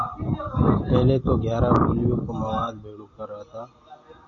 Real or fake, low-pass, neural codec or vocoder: real; 7.2 kHz; none